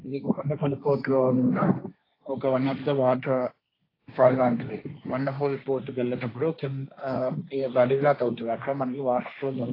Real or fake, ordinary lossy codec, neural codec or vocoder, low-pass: fake; AAC, 24 kbps; codec, 16 kHz, 1.1 kbps, Voila-Tokenizer; 5.4 kHz